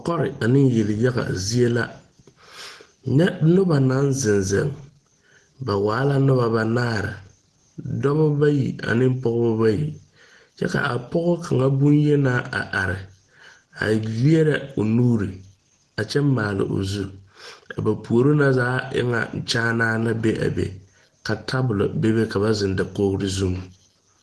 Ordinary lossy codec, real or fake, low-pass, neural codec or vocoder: Opus, 16 kbps; real; 10.8 kHz; none